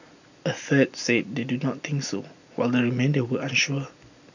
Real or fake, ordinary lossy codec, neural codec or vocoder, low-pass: real; none; none; 7.2 kHz